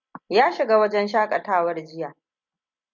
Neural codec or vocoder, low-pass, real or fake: none; 7.2 kHz; real